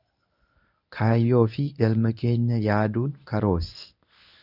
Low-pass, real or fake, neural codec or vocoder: 5.4 kHz; fake; codec, 24 kHz, 0.9 kbps, WavTokenizer, medium speech release version 1